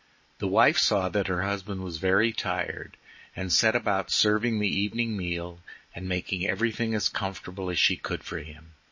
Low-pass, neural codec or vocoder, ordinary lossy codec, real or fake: 7.2 kHz; none; MP3, 32 kbps; real